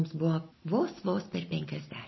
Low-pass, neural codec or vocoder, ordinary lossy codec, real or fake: 7.2 kHz; none; MP3, 24 kbps; real